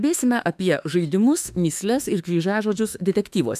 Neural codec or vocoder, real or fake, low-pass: autoencoder, 48 kHz, 32 numbers a frame, DAC-VAE, trained on Japanese speech; fake; 14.4 kHz